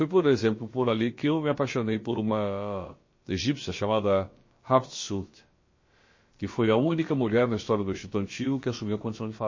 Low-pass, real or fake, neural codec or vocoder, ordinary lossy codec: 7.2 kHz; fake; codec, 16 kHz, about 1 kbps, DyCAST, with the encoder's durations; MP3, 32 kbps